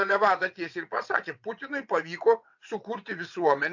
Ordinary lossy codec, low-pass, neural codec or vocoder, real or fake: MP3, 64 kbps; 7.2 kHz; vocoder, 22.05 kHz, 80 mel bands, WaveNeXt; fake